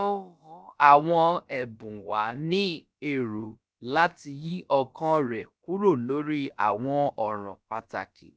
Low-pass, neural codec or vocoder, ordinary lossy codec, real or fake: none; codec, 16 kHz, about 1 kbps, DyCAST, with the encoder's durations; none; fake